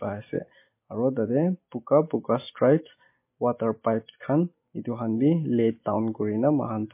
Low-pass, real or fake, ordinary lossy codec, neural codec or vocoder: 3.6 kHz; real; MP3, 24 kbps; none